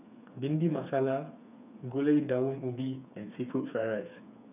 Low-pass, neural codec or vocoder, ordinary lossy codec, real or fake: 3.6 kHz; codec, 16 kHz, 4 kbps, FreqCodec, smaller model; none; fake